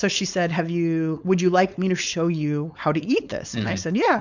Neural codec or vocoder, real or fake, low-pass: codec, 16 kHz, 4.8 kbps, FACodec; fake; 7.2 kHz